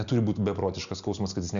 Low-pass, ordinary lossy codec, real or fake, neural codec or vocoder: 7.2 kHz; Opus, 64 kbps; real; none